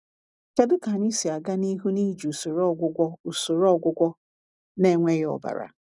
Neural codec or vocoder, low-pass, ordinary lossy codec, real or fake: none; 10.8 kHz; none; real